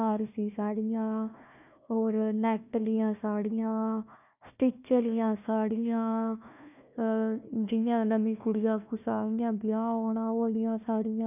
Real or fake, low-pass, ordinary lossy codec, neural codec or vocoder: fake; 3.6 kHz; none; codec, 16 kHz, 1 kbps, FunCodec, trained on Chinese and English, 50 frames a second